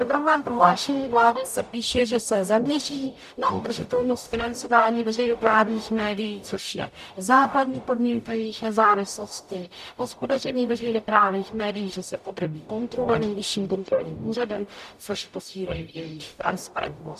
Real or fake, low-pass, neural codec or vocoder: fake; 14.4 kHz; codec, 44.1 kHz, 0.9 kbps, DAC